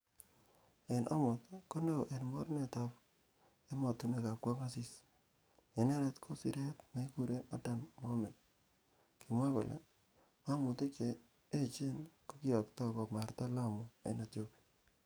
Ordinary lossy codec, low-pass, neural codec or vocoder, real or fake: none; none; codec, 44.1 kHz, 7.8 kbps, DAC; fake